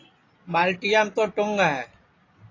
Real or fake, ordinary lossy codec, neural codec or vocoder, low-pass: real; AAC, 32 kbps; none; 7.2 kHz